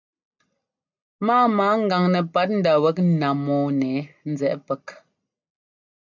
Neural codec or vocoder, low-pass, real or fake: none; 7.2 kHz; real